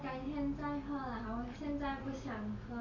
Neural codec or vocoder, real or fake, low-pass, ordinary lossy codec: none; real; 7.2 kHz; none